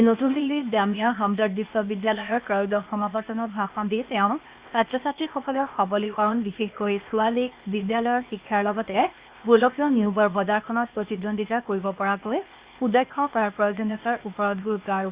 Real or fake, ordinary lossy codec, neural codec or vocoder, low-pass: fake; Opus, 64 kbps; codec, 16 kHz, 0.8 kbps, ZipCodec; 3.6 kHz